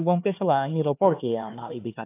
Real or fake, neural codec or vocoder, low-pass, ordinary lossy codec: fake; codec, 16 kHz, 2 kbps, X-Codec, HuBERT features, trained on LibriSpeech; 3.6 kHz; AAC, 24 kbps